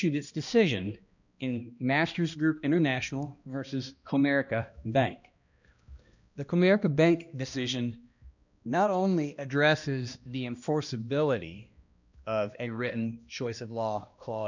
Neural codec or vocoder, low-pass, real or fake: codec, 16 kHz, 1 kbps, X-Codec, HuBERT features, trained on balanced general audio; 7.2 kHz; fake